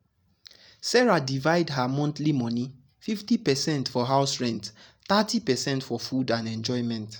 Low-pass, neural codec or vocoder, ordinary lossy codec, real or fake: none; none; none; real